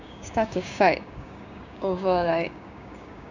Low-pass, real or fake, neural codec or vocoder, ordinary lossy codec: 7.2 kHz; fake; codec, 16 kHz, 6 kbps, DAC; none